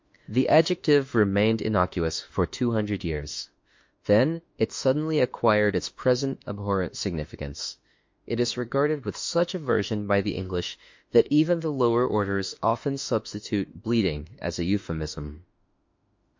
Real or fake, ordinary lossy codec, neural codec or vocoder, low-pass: fake; MP3, 48 kbps; autoencoder, 48 kHz, 32 numbers a frame, DAC-VAE, trained on Japanese speech; 7.2 kHz